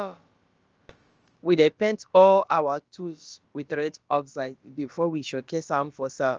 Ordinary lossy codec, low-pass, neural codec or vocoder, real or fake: Opus, 24 kbps; 7.2 kHz; codec, 16 kHz, about 1 kbps, DyCAST, with the encoder's durations; fake